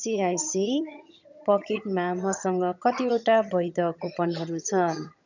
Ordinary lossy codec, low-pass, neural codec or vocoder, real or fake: none; 7.2 kHz; vocoder, 22.05 kHz, 80 mel bands, HiFi-GAN; fake